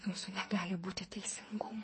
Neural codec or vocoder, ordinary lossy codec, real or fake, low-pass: codec, 44.1 kHz, 2.6 kbps, SNAC; MP3, 32 kbps; fake; 10.8 kHz